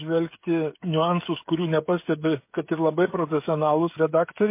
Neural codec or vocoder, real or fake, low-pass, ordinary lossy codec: codec, 16 kHz, 16 kbps, FreqCodec, smaller model; fake; 3.6 kHz; MP3, 24 kbps